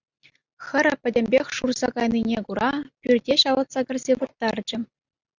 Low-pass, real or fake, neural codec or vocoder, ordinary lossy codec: 7.2 kHz; real; none; Opus, 64 kbps